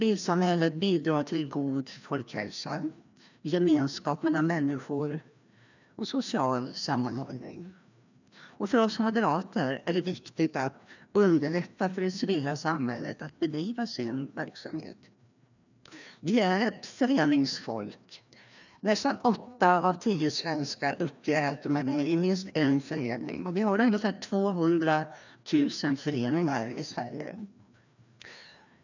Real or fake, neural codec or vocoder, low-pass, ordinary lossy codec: fake; codec, 16 kHz, 1 kbps, FreqCodec, larger model; 7.2 kHz; none